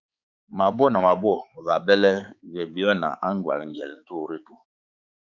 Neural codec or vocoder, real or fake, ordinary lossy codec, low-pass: codec, 16 kHz, 4 kbps, X-Codec, HuBERT features, trained on balanced general audio; fake; Opus, 64 kbps; 7.2 kHz